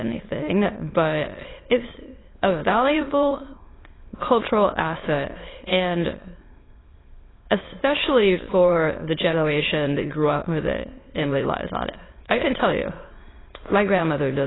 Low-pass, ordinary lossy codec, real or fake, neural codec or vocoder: 7.2 kHz; AAC, 16 kbps; fake; autoencoder, 22.05 kHz, a latent of 192 numbers a frame, VITS, trained on many speakers